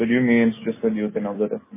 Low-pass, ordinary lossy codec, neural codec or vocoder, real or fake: 3.6 kHz; MP3, 16 kbps; none; real